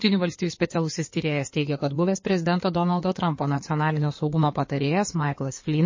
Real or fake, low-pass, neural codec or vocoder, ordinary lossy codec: fake; 7.2 kHz; codec, 16 kHz in and 24 kHz out, 2.2 kbps, FireRedTTS-2 codec; MP3, 32 kbps